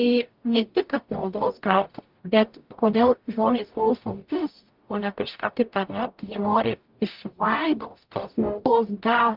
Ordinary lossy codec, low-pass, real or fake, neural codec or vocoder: Opus, 32 kbps; 5.4 kHz; fake; codec, 44.1 kHz, 0.9 kbps, DAC